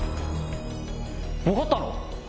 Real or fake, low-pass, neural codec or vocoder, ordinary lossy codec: real; none; none; none